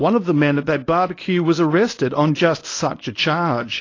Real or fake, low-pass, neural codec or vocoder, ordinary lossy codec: fake; 7.2 kHz; codec, 24 kHz, 0.9 kbps, WavTokenizer, medium speech release version 1; AAC, 32 kbps